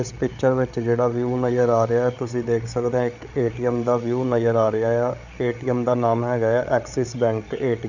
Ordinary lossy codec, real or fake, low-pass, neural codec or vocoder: none; fake; 7.2 kHz; codec, 16 kHz, 8 kbps, FreqCodec, larger model